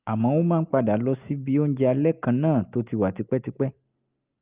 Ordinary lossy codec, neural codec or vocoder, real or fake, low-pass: Opus, 32 kbps; none; real; 3.6 kHz